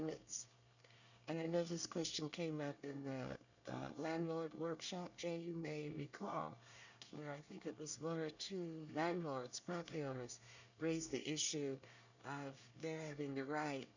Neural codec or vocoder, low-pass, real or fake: codec, 24 kHz, 1 kbps, SNAC; 7.2 kHz; fake